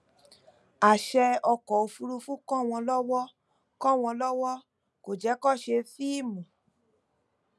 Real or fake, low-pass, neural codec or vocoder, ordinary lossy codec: real; none; none; none